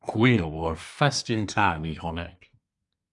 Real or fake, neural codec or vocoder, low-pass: fake; codec, 24 kHz, 1 kbps, SNAC; 10.8 kHz